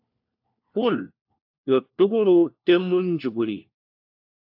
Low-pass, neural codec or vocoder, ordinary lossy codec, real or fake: 5.4 kHz; codec, 16 kHz, 1 kbps, FunCodec, trained on LibriTTS, 50 frames a second; AAC, 48 kbps; fake